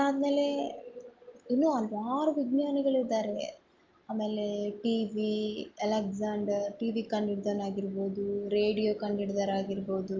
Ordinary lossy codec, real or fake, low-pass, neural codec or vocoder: Opus, 32 kbps; real; 7.2 kHz; none